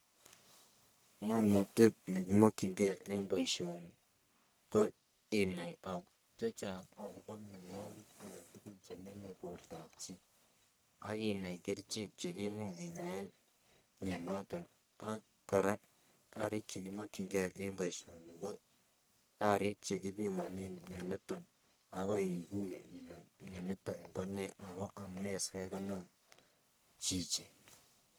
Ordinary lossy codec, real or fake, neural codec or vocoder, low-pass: none; fake; codec, 44.1 kHz, 1.7 kbps, Pupu-Codec; none